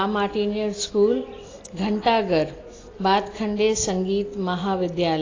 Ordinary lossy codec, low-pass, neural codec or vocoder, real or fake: AAC, 32 kbps; 7.2 kHz; none; real